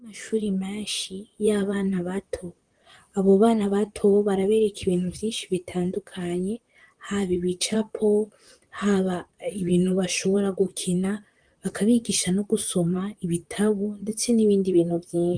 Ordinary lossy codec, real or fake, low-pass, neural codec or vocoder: Opus, 32 kbps; fake; 9.9 kHz; vocoder, 44.1 kHz, 128 mel bands, Pupu-Vocoder